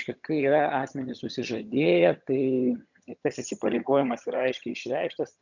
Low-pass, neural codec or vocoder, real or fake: 7.2 kHz; vocoder, 22.05 kHz, 80 mel bands, HiFi-GAN; fake